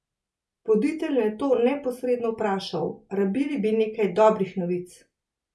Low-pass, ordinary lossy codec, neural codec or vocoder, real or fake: none; none; none; real